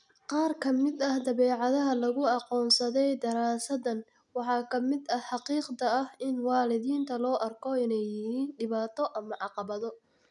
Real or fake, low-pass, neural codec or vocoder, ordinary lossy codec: real; 10.8 kHz; none; none